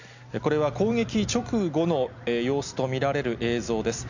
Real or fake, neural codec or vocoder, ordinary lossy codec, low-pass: real; none; none; 7.2 kHz